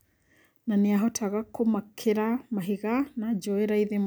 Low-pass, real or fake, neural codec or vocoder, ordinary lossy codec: none; real; none; none